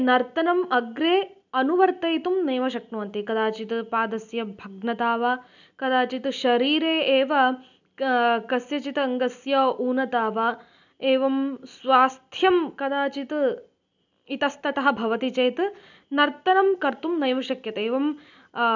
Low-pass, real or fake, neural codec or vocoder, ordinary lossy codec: 7.2 kHz; real; none; none